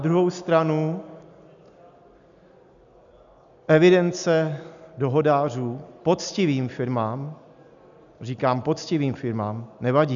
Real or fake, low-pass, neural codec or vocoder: real; 7.2 kHz; none